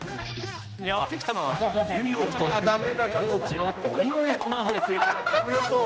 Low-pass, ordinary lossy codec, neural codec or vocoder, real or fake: none; none; codec, 16 kHz, 1 kbps, X-Codec, HuBERT features, trained on general audio; fake